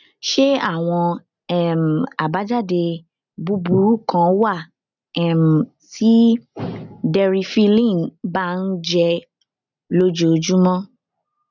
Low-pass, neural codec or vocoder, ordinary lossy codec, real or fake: 7.2 kHz; none; none; real